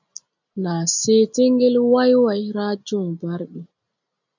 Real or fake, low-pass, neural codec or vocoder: real; 7.2 kHz; none